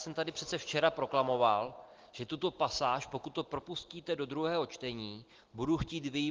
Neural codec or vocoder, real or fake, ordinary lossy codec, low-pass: none; real; Opus, 16 kbps; 7.2 kHz